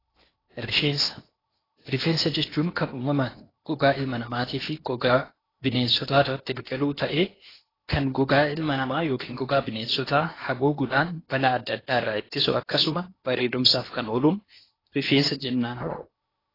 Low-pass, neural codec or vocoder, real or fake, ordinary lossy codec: 5.4 kHz; codec, 16 kHz in and 24 kHz out, 0.8 kbps, FocalCodec, streaming, 65536 codes; fake; AAC, 24 kbps